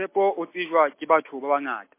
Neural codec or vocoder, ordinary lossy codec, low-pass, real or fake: none; MP3, 24 kbps; 3.6 kHz; real